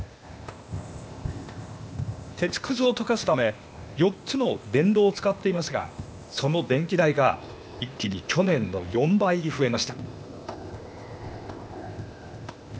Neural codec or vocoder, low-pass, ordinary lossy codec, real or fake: codec, 16 kHz, 0.8 kbps, ZipCodec; none; none; fake